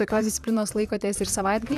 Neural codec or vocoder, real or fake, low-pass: vocoder, 44.1 kHz, 128 mel bands, Pupu-Vocoder; fake; 14.4 kHz